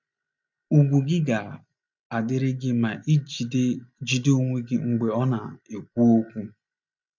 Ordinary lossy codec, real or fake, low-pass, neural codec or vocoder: none; real; 7.2 kHz; none